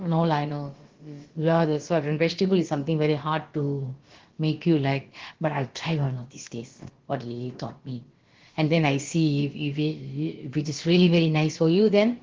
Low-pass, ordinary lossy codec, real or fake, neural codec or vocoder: 7.2 kHz; Opus, 16 kbps; fake; codec, 16 kHz, about 1 kbps, DyCAST, with the encoder's durations